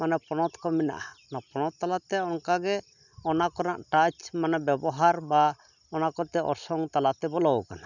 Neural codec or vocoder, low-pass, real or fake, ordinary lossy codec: none; 7.2 kHz; real; none